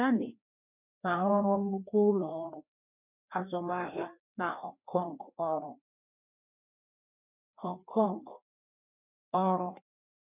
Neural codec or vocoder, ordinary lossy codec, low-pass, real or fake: codec, 16 kHz, 2 kbps, FreqCodec, larger model; none; 3.6 kHz; fake